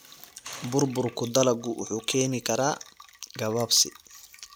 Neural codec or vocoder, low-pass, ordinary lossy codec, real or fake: none; none; none; real